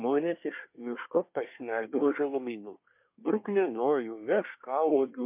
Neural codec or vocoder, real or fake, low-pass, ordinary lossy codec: codec, 24 kHz, 1 kbps, SNAC; fake; 3.6 kHz; MP3, 32 kbps